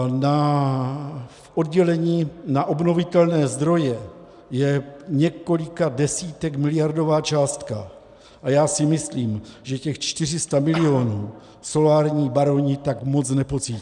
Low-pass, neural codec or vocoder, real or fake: 10.8 kHz; none; real